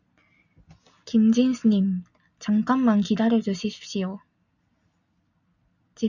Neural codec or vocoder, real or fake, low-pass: none; real; 7.2 kHz